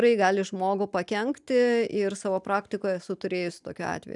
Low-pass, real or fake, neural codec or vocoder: 10.8 kHz; real; none